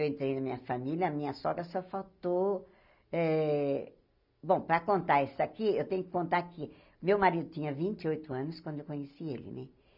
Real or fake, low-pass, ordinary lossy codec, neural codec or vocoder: real; 5.4 kHz; none; none